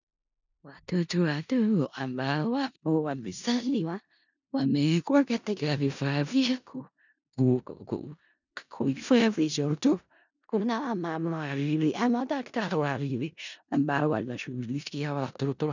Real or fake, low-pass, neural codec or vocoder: fake; 7.2 kHz; codec, 16 kHz in and 24 kHz out, 0.4 kbps, LongCat-Audio-Codec, four codebook decoder